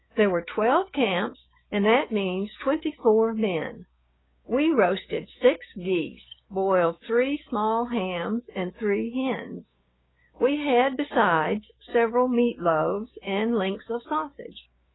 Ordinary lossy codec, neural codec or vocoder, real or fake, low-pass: AAC, 16 kbps; codec, 16 kHz, 6 kbps, DAC; fake; 7.2 kHz